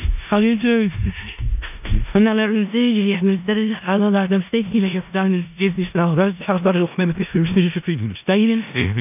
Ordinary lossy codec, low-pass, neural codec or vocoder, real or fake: none; 3.6 kHz; codec, 16 kHz in and 24 kHz out, 0.4 kbps, LongCat-Audio-Codec, four codebook decoder; fake